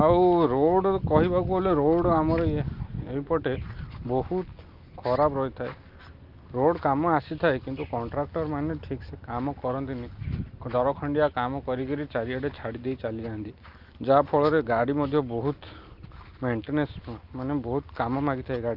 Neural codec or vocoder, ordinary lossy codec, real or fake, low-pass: none; Opus, 24 kbps; real; 5.4 kHz